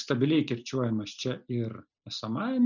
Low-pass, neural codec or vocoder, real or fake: 7.2 kHz; none; real